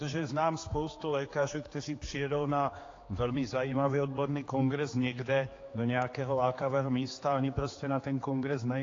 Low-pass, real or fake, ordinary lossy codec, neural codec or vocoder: 7.2 kHz; fake; AAC, 32 kbps; codec, 16 kHz, 4 kbps, X-Codec, HuBERT features, trained on general audio